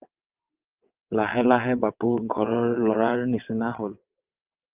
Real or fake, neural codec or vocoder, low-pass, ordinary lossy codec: fake; vocoder, 22.05 kHz, 80 mel bands, WaveNeXt; 3.6 kHz; Opus, 24 kbps